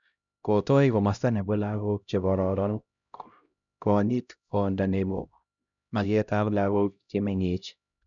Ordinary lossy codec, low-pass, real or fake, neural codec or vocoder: none; 7.2 kHz; fake; codec, 16 kHz, 0.5 kbps, X-Codec, HuBERT features, trained on LibriSpeech